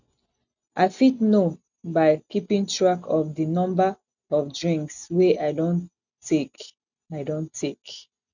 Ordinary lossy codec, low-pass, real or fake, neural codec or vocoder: none; 7.2 kHz; real; none